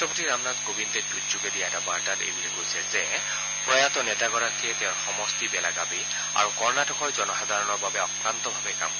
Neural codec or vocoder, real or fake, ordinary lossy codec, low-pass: none; real; none; 7.2 kHz